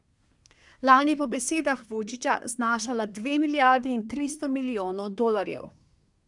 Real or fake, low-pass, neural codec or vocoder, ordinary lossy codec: fake; 10.8 kHz; codec, 24 kHz, 1 kbps, SNAC; none